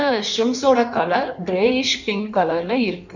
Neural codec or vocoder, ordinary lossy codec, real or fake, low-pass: codec, 16 kHz in and 24 kHz out, 1.1 kbps, FireRedTTS-2 codec; none; fake; 7.2 kHz